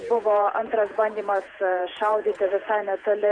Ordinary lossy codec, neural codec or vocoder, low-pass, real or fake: MP3, 96 kbps; vocoder, 48 kHz, 128 mel bands, Vocos; 9.9 kHz; fake